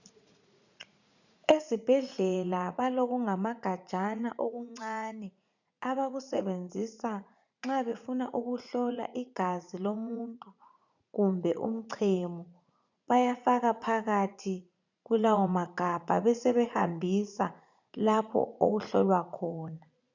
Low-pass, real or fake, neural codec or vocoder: 7.2 kHz; fake; vocoder, 44.1 kHz, 80 mel bands, Vocos